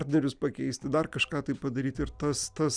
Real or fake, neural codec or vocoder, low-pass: real; none; 9.9 kHz